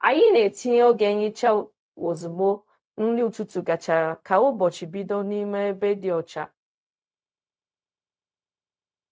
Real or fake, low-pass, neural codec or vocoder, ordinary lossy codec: fake; none; codec, 16 kHz, 0.4 kbps, LongCat-Audio-Codec; none